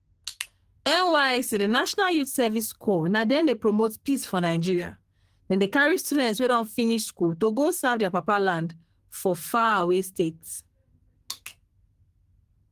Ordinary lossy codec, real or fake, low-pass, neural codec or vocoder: Opus, 24 kbps; fake; 14.4 kHz; codec, 44.1 kHz, 2.6 kbps, SNAC